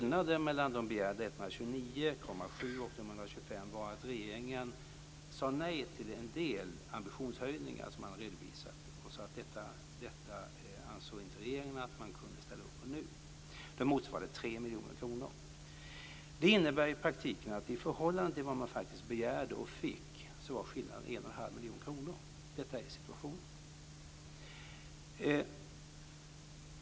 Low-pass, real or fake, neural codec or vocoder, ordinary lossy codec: none; real; none; none